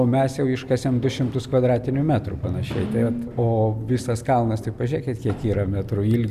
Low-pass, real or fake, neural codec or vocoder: 14.4 kHz; real; none